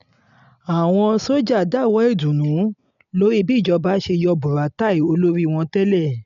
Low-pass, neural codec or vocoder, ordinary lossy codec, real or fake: 7.2 kHz; none; none; real